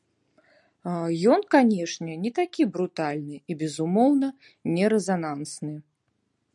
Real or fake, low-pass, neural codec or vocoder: real; 10.8 kHz; none